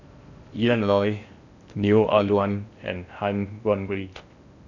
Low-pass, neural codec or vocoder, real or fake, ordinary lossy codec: 7.2 kHz; codec, 16 kHz in and 24 kHz out, 0.6 kbps, FocalCodec, streaming, 4096 codes; fake; none